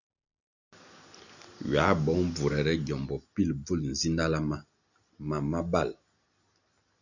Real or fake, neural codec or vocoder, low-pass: real; none; 7.2 kHz